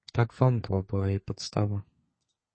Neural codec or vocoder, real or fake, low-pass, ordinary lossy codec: codec, 32 kHz, 1.9 kbps, SNAC; fake; 9.9 kHz; MP3, 32 kbps